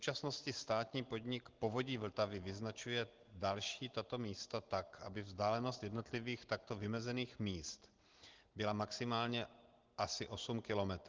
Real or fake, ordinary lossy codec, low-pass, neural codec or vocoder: real; Opus, 16 kbps; 7.2 kHz; none